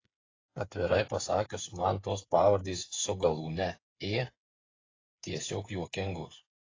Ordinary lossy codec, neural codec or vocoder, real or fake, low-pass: AAC, 32 kbps; codec, 16 kHz, 8 kbps, FreqCodec, smaller model; fake; 7.2 kHz